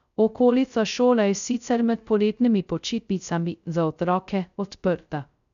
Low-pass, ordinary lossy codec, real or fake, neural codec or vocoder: 7.2 kHz; none; fake; codec, 16 kHz, 0.2 kbps, FocalCodec